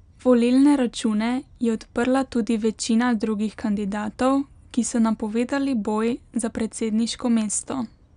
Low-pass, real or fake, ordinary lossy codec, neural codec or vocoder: 9.9 kHz; real; none; none